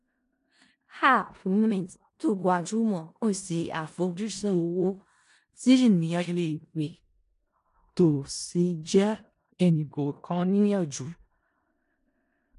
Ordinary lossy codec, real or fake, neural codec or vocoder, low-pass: AAC, 48 kbps; fake; codec, 16 kHz in and 24 kHz out, 0.4 kbps, LongCat-Audio-Codec, four codebook decoder; 10.8 kHz